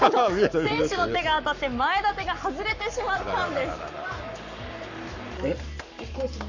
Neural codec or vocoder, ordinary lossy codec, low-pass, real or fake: codec, 44.1 kHz, 7.8 kbps, Pupu-Codec; none; 7.2 kHz; fake